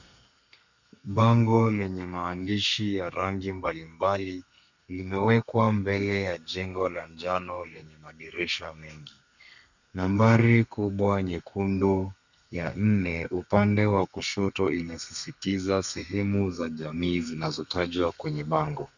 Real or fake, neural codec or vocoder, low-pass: fake; codec, 32 kHz, 1.9 kbps, SNAC; 7.2 kHz